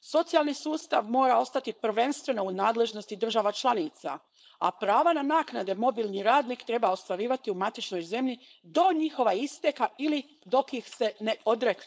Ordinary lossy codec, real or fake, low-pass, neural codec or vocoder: none; fake; none; codec, 16 kHz, 4.8 kbps, FACodec